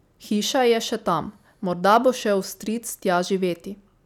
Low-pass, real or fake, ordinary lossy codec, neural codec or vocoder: 19.8 kHz; real; none; none